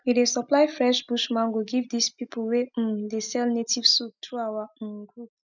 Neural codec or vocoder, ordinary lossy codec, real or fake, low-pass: none; none; real; 7.2 kHz